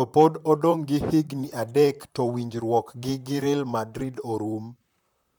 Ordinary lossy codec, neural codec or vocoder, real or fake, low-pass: none; vocoder, 44.1 kHz, 128 mel bands, Pupu-Vocoder; fake; none